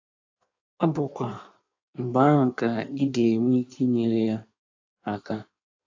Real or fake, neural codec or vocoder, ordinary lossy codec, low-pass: fake; codec, 16 kHz in and 24 kHz out, 1.1 kbps, FireRedTTS-2 codec; AAC, 48 kbps; 7.2 kHz